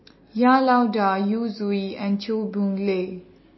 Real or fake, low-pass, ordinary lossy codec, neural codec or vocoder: real; 7.2 kHz; MP3, 24 kbps; none